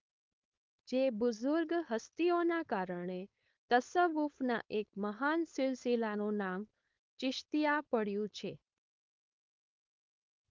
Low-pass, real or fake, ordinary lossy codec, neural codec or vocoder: 7.2 kHz; fake; Opus, 24 kbps; codec, 16 kHz, 4.8 kbps, FACodec